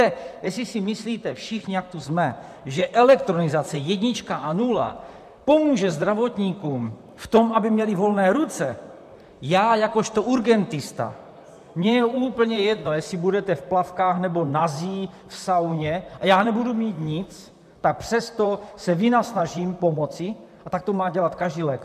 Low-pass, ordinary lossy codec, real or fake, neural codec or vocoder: 14.4 kHz; MP3, 96 kbps; fake; vocoder, 44.1 kHz, 128 mel bands, Pupu-Vocoder